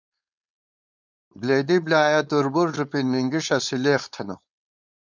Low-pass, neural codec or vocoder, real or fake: 7.2 kHz; codec, 16 kHz, 4.8 kbps, FACodec; fake